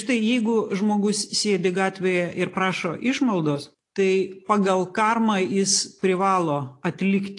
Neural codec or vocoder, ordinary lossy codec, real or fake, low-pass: none; AAC, 48 kbps; real; 10.8 kHz